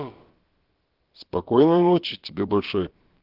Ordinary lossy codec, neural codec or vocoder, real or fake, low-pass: Opus, 16 kbps; codec, 16 kHz, about 1 kbps, DyCAST, with the encoder's durations; fake; 5.4 kHz